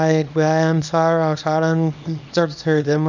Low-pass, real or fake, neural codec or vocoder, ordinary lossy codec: 7.2 kHz; fake; codec, 24 kHz, 0.9 kbps, WavTokenizer, small release; none